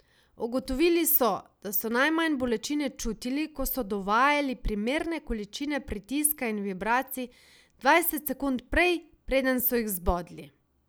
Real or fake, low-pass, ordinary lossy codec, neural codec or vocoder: real; none; none; none